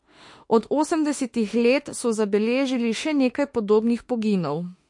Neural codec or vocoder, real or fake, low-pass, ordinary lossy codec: autoencoder, 48 kHz, 32 numbers a frame, DAC-VAE, trained on Japanese speech; fake; 10.8 kHz; MP3, 48 kbps